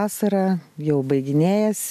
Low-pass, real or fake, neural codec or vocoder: 14.4 kHz; real; none